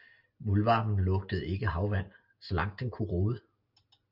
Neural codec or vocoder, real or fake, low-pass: none; real; 5.4 kHz